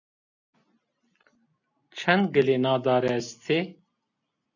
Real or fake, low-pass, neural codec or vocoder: real; 7.2 kHz; none